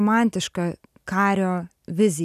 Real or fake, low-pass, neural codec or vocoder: real; 14.4 kHz; none